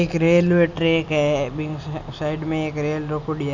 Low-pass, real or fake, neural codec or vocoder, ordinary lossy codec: 7.2 kHz; real; none; none